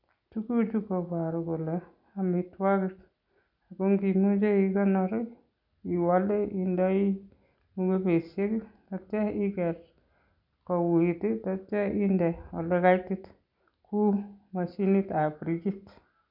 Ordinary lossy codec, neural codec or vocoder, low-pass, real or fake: none; none; 5.4 kHz; real